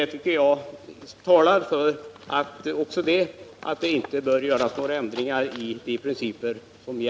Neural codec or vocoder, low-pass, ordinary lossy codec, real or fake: none; none; none; real